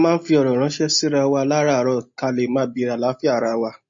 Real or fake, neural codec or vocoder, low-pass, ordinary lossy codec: real; none; 7.2 kHz; MP3, 32 kbps